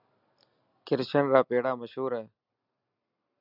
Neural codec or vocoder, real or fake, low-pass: none; real; 5.4 kHz